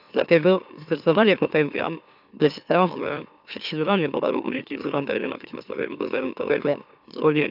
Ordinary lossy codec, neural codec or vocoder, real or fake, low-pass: none; autoencoder, 44.1 kHz, a latent of 192 numbers a frame, MeloTTS; fake; 5.4 kHz